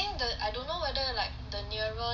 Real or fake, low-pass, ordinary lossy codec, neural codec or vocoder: real; 7.2 kHz; none; none